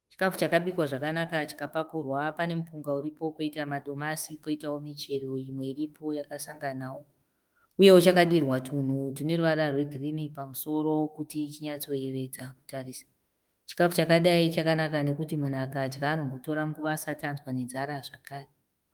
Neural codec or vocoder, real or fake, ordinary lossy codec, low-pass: autoencoder, 48 kHz, 32 numbers a frame, DAC-VAE, trained on Japanese speech; fake; Opus, 32 kbps; 19.8 kHz